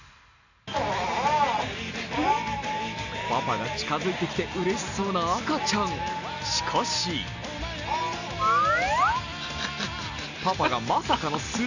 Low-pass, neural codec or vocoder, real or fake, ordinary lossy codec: 7.2 kHz; none; real; none